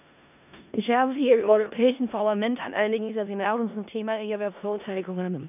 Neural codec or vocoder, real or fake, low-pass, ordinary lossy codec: codec, 16 kHz in and 24 kHz out, 0.4 kbps, LongCat-Audio-Codec, four codebook decoder; fake; 3.6 kHz; none